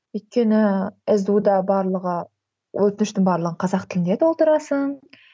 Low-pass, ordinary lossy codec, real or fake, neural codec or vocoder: none; none; real; none